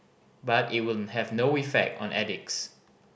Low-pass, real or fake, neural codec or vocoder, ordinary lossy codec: none; real; none; none